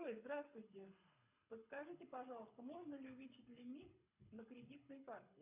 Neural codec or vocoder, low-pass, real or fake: codec, 24 kHz, 6 kbps, HILCodec; 3.6 kHz; fake